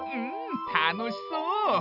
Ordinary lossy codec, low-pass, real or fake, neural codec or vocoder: AAC, 48 kbps; 5.4 kHz; real; none